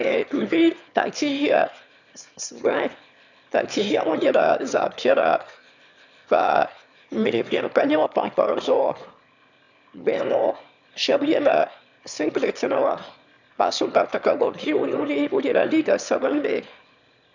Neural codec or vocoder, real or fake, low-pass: autoencoder, 22.05 kHz, a latent of 192 numbers a frame, VITS, trained on one speaker; fake; 7.2 kHz